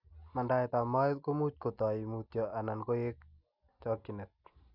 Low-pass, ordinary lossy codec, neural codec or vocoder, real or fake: 5.4 kHz; none; none; real